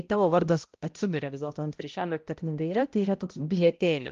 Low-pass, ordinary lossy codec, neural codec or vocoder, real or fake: 7.2 kHz; Opus, 32 kbps; codec, 16 kHz, 0.5 kbps, X-Codec, HuBERT features, trained on balanced general audio; fake